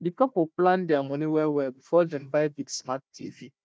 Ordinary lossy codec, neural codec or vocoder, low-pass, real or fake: none; codec, 16 kHz, 1 kbps, FunCodec, trained on Chinese and English, 50 frames a second; none; fake